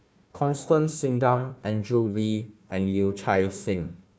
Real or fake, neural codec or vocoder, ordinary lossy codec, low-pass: fake; codec, 16 kHz, 1 kbps, FunCodec, trained on Chinese and English, 50 frames a second; none; none